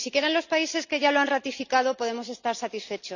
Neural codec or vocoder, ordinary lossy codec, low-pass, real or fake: none; none; 7.2 kHz; real